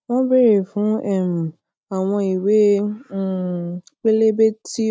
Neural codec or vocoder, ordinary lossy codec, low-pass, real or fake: none; none; none; real